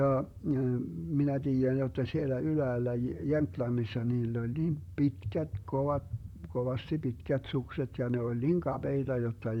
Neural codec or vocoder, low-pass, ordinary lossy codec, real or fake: vocoder, 44.1 kHz, 128 mel bands, Pupu-Vocoder; 19.8 kHz; none; fake